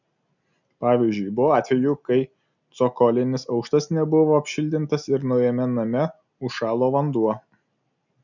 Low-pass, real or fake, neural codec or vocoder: 7.2 kHz; real; none